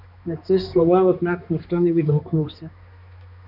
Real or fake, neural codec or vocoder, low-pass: fake; codec, 16 kHz, 2 kbps, X-Codec, HuBERT features, trained on balanced general audio; 5.4 kHz